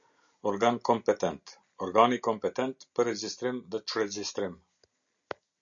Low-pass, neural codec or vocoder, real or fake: 7.2 kHz; none; real